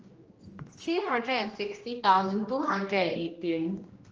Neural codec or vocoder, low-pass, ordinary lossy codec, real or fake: codec, 16 kHz, 1 kbps, X-Codec, HuBERT features, trained on general audio; 7.2 kHz; Opus, 24 kbps; fake